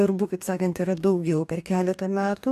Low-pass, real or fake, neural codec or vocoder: 14.4 kHz; fake; codec, 44.1 kHz, 2.6 kbps, DAC